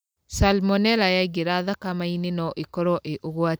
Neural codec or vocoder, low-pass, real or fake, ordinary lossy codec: none; none; real; none